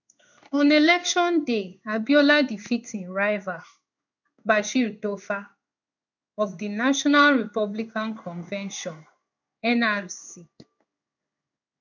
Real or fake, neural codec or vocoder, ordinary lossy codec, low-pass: fake; codec, 16 kHz in and 24 kHz out, 1 kbps, XY-Tokenizer; none; 7.2 kHz